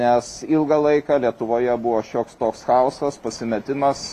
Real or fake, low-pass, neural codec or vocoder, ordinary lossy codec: real; 14.4 kHz; none; AAC, 64 kbps